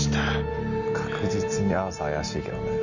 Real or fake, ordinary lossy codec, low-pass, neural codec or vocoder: real; none; 7.2 kHz; none